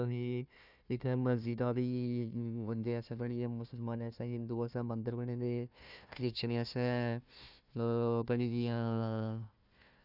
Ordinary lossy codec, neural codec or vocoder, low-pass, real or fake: none; codec, 16 kHz, 1 kbps, FunCodec, trained on Chinese and English, 50 frames a second; 5.4 kHz; fake